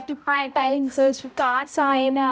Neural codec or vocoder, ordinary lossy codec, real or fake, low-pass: codec, 16 kHz, 0.5 kbps, X-Codec, HuBERT features, trained on balanced general audio; none; fake; none